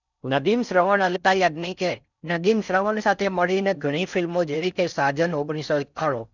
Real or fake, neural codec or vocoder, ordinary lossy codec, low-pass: fake; codec, 16 kHz in and 24 kHz out, 0.6 kbps, FocalCodec, streaming, 4096 codes; none; 7.2 kHz